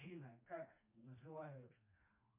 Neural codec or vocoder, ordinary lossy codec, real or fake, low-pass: codec, 16 kHz, 2 kbps, FreqCodec, smaller model; MP3, 24 kbps; fake; 3.6 kHz